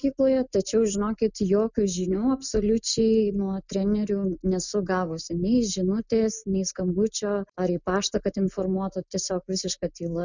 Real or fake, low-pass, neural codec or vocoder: real; 7.2 kHz; none